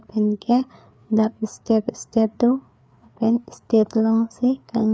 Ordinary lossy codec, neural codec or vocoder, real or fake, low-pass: none; codec, 16 kHz, 4 kbps, FreqCodec, larger model; fake; none